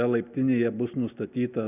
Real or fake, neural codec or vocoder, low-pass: real; none; 3.6 kHz